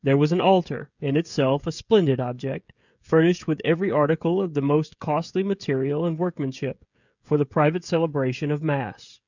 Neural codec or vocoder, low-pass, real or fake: codec, 16 kHz, 16 kbps, FreqCodec, smaller model; 7.2 kHz; fake